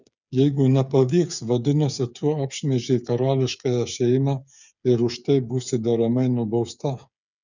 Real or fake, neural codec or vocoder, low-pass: fake; codec, 16 kHz, 8 kbps, FreqCodec, smaller model; 7.2 kHz